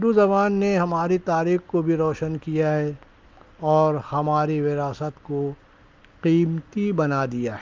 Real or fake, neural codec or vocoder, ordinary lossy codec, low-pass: real; none; Opus, 32 kbps; 7.2 kHz